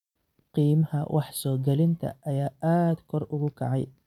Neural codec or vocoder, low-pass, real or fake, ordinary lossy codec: none; 19.8 kHz; real; none